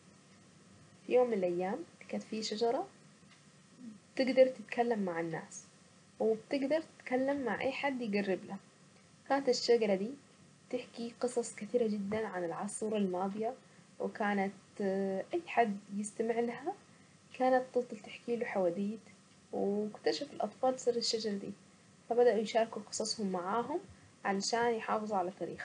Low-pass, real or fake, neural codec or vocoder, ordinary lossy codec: 9.9 kHz; real; none; none